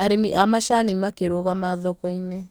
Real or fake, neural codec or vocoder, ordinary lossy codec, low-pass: fake; codec, 44.1 kHz, 2.6 kbps, DAC; none; none